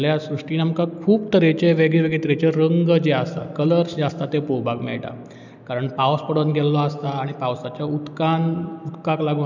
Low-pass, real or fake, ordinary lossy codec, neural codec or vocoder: 7.2 kHz; real; none; none